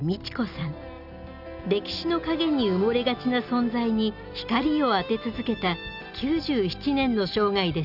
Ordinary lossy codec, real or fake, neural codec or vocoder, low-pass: none; real; none; 5.4 kHz